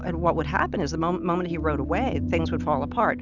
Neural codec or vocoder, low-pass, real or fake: none; 7.2 kHz; real